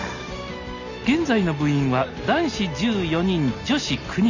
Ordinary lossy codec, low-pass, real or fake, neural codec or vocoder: none; 7.2 kHz; real; none